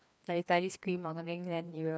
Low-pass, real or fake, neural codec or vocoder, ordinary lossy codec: none; fake; codec, 16 kHz, 2 kbps, FreqCodec, larger model; none